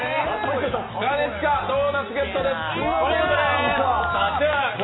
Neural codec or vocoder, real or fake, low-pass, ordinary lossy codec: none; real; 7.2 kHz; AAC, 16 kbps